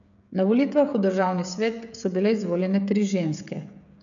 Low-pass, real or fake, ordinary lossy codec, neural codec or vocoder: 7.2 kHz; fake; MP3, 96 kbps; codec, 16 kHz, 16 kbps, FreqCodec, smaller model